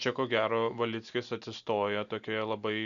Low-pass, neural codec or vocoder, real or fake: 7.2 kHz; none; real